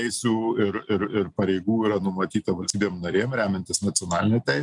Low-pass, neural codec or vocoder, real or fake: 10.8 kHz; none; real